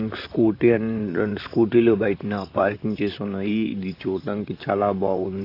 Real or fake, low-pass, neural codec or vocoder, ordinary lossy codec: fake; 5.4 kHz; vocoder, 44.1 kHz, 128 mel bands, Pupu-Vocoder; AAC, 32 kbps